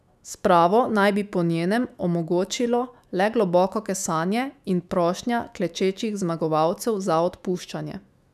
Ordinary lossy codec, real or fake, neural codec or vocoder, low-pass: none; fake; autoencoder, 48 kHz, 128 numbers a frame, DAC-VAE, trained on Japanese speech; 14.4 kHz